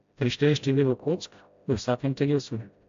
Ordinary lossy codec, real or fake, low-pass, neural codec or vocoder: AAC, 64 kbps; fake; 7.2 kHz; codec, 16 kHz, 0.5 kbps, FreqCodec, smaller model